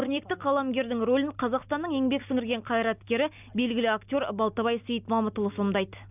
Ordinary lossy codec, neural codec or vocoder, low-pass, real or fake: none; none; 3.6 kHz; real